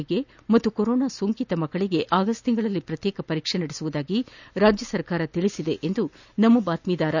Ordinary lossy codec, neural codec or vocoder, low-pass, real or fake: none; none; 7.2 kHz; real